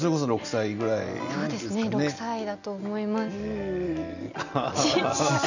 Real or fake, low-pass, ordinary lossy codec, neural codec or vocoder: real; 7.2 kHz; none; none